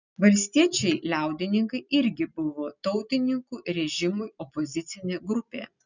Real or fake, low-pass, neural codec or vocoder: real; 7.2 kHz; none